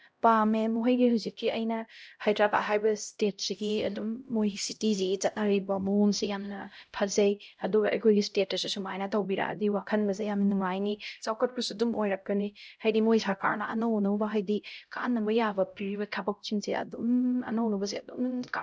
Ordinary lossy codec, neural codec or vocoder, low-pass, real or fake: none; codec, 16 kHz, 0.5 kbps, X-Codec, HuBERT features, trained on LibriSpeech; none; fake